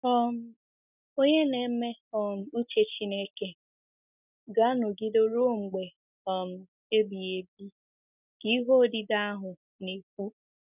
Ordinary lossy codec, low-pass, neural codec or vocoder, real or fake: none; 3.6 kHz; none; real